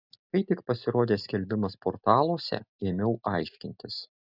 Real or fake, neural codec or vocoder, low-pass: real; none; 5.4 kHz